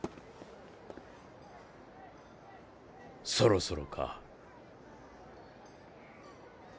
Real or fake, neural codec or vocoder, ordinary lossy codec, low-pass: real; none; none; none